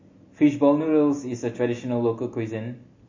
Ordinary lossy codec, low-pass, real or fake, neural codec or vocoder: MP3, 32 kbps; 7.2 kHz; real; none